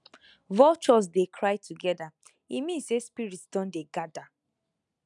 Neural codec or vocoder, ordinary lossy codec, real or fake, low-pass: none; none; real; 10.8 kHz